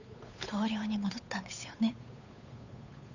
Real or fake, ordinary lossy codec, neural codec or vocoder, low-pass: fake; none; codec, 16 kHz, 8 kbps, FunCodec, trained on Chinese and English, 25 frames a second; 7.2 kHz